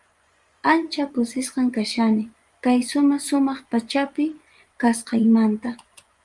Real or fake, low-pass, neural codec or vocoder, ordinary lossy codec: fake; 10.8 kHz; vocoder, 24 kHz, 100 mel bands, Vocos; Opus, 32 kbps